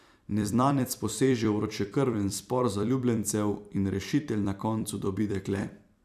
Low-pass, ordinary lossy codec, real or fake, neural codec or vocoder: 14.4 kHz; none; fake; vocoder, 44.1 kHz, 128 mel bands every 256 samples, BigVGAN v2